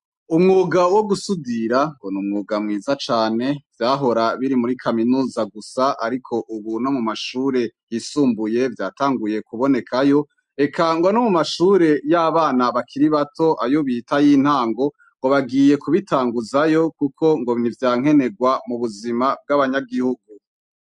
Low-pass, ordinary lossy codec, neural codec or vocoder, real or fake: 10.8 kHz; MP3, 64 kbps; none; real